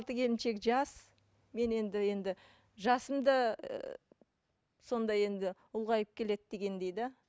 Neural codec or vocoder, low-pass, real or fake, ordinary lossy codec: none; none; real; none